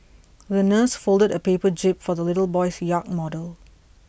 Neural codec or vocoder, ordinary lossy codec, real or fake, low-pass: none; none; real; none